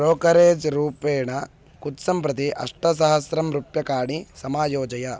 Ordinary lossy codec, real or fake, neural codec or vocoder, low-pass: none; real; none; none